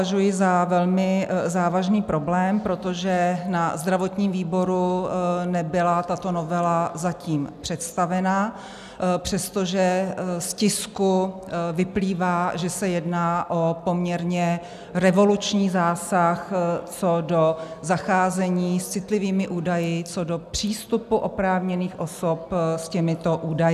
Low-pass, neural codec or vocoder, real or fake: 14.4 kHz; none; real